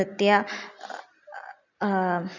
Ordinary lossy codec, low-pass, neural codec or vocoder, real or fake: none; 7.2 kHz; none; real